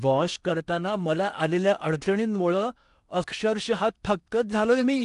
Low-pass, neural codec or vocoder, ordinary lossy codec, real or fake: 10.8 kHz; codec, 16 kHz in and 24 kHz out, 0.8 kbps, FocalCodec, streaming, 65536 codes; none; fake